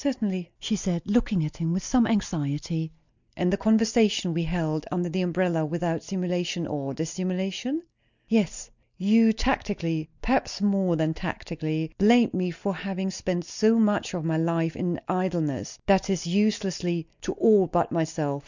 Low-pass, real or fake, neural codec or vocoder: 7.2 kHz; real; none